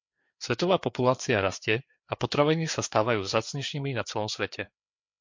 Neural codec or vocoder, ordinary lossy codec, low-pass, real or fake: codec, 16 kHz, 4 kbps, FreqCodec, larger model; MP3, 48 kbps; 7.2 kHz; fake